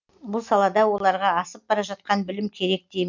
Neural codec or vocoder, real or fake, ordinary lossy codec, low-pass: none; real; MP3, 64 kbps; 7.2 kHz